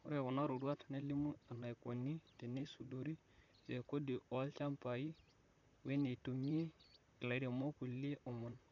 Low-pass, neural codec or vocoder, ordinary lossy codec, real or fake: 7.2 kHz; vocoder, 44.1 kHz, 128 mel bands, Pupu-Vocoder; none; fake